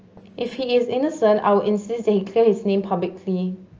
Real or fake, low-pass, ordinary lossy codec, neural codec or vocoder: real; 7.2 kHz; Opus, 24 kbps; none